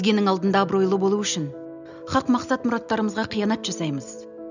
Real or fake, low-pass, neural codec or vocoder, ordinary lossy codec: real; 7.2 kHz; none; none